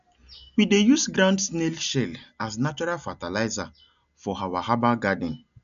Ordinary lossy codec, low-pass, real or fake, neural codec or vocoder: none; 7.2 kHz; real; none